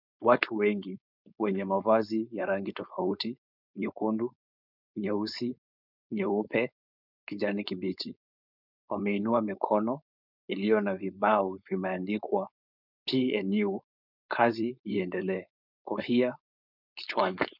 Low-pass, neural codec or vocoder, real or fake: 5.4 kHz; codec, 16 kHz, 4.8 kbps, FACodec; fake